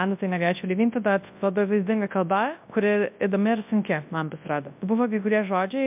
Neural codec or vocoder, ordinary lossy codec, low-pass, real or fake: codec, 24 kHz, 0.9 kbps, WavTokenizer, large speech release; MP3, 32 kbps; 3.6 kHz; fake